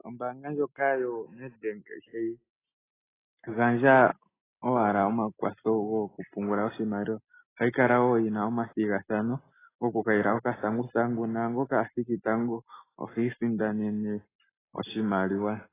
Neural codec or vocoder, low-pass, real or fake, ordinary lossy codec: none; 3.6 kHz; real; AAC, 16 kbps